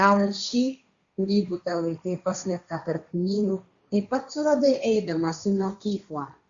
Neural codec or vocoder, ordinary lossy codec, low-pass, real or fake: codec, 16 kHz, 1.1 kbps, Voila-Tokenizer; Opus, 64 kbps; 7.2 kHz; fake